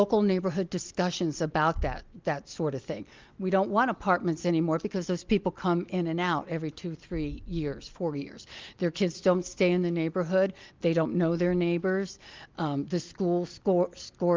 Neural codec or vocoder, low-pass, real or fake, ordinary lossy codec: none; 7.2 kHz; real; Opus, 24 kbps